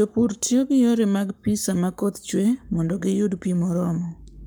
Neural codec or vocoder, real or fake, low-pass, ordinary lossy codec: codec, 44.1 kHz, 7.8 kbps, Pupu-Codec; fake; none; none